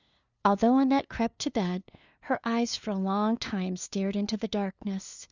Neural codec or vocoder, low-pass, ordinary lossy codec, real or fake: codec, 16 kHz, 2 kbps, FunCodec, trained on LibriTTS, 25 frames a second; 7.2 kHz; Opus, 64 kbps; fake